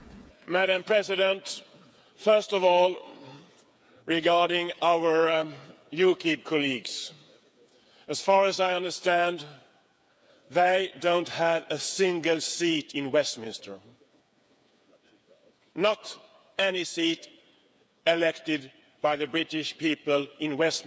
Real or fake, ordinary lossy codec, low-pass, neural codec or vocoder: fake; none; none; codec, 16 kHz, 8 kbps, FreqCodec, smaller model